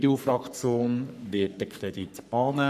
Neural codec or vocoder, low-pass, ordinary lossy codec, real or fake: codec, 44.1 kHz, 3.4 kbps, Pupu-Codec; 14.4 kHz; none; fake